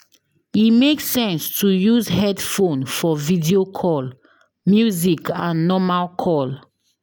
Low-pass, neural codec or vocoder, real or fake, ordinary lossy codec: none; none; real; none